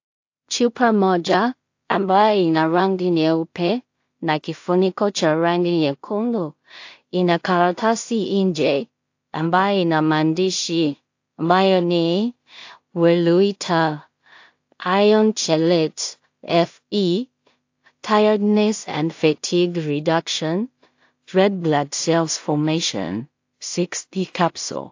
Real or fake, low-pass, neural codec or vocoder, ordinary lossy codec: fake; 7.2 kHz; codec, 16 kHz in and 24 kHz out, 0.4 kbps, LongCat-Audio-Codec, two codebook decoder; AAC, 48 kbps